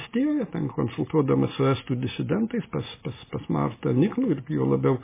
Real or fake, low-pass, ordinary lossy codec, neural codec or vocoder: real; 3.6 kHz; MP3, 16 kbps; none